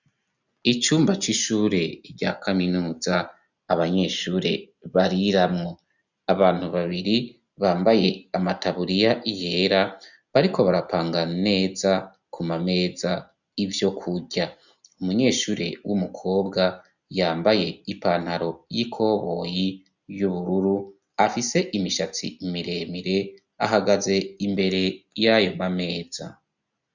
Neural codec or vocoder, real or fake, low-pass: none; real; 7.2 kHz